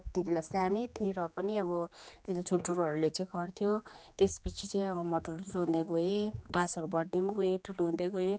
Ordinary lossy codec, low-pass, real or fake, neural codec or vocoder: none; none; fake; codec, 16 kHz, 2 kbps, X-Codec, HuBERT features, trained on general audio